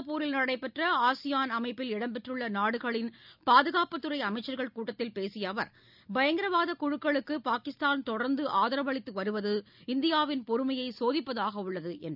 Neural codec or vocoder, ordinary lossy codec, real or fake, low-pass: none; none; real; 5.4 kHz